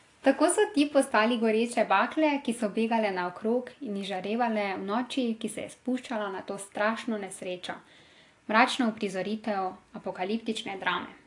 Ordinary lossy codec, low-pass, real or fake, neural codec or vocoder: AAC, 48 kbps; 10.8 kHz; real; none